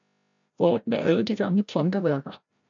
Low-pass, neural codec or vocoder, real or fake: 7.2 kHz; codec, 16 kHz, 0.5 kbps, FreqCodec, larger model; fake